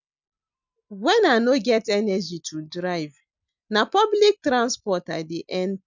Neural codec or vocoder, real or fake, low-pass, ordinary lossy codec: none; real; 7.2 kHz; none